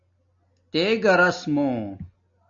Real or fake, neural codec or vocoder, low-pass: real; none; 7.2 kHz